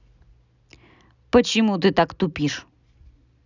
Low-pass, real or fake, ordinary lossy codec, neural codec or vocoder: 7.2 kHz; real; none; none